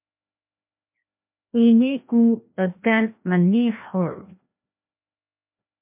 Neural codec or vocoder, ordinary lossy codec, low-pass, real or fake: codec, 16 kHz, 1 kbps, FreqCodec, larger model; MP3, 32 kbps; 3.6 kHz; fake